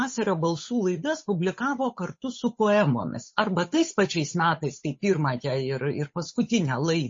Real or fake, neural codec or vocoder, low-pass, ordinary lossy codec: fake; codec, 16 kHz, 8 kbps, FunCodec, trained on Chinese and English, 25 frames a second; 7.2 kHz; MP3, 32 kbps